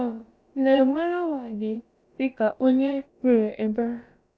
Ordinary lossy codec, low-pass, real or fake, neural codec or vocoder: none; none; fake; codec, 16 kHz, about 1 kbps, DyCAST, with the encoder's durations